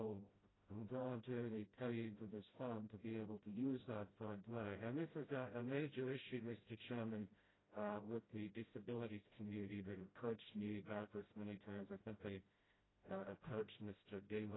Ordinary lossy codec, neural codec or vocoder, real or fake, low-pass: AAC, 16 kbps; codec, 16 kHz, 0.5 kbps, FreqCodec, smaller model; fake; 7.2 kHz